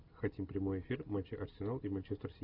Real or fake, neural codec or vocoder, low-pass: real; none; 5.4 kHz